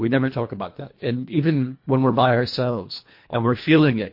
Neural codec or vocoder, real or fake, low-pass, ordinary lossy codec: codec, 24 kHz, 1.5 kbps, HILCodec; fake; 5.4 kHz; MP3, 32 kbps